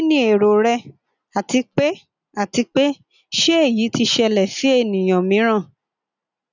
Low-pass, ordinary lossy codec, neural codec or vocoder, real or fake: 7.2 kHz; none; none; real